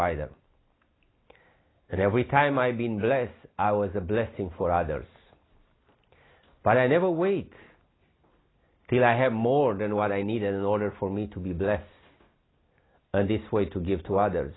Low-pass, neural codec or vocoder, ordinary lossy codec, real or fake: 7.2 kHz; none; AAC, 16 kbps; real